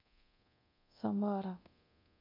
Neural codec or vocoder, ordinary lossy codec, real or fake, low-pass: codec, 24 kHz, 0.9 kbps, DualCodec; MP3, 48 kbps; fake; 5.4 kHz